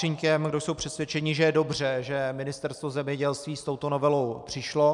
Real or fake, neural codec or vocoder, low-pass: real; none; 10.8 kHz